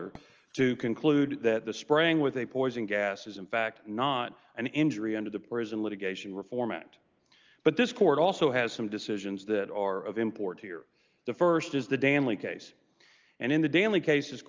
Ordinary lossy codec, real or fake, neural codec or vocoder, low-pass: Opus, 24 kbps; real; none; 7.2 kHz